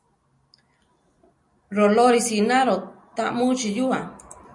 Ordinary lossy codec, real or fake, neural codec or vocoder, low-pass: MP3, 48 kbps; real; none; 10.8 kHz